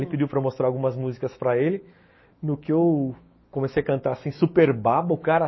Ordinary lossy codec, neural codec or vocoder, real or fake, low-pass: MP3, 24 kbps; autoencoder, 48 kHz, 128 numbers a frame, DAC-VAE, trained on Japanese speech; fake; 7.2 kHz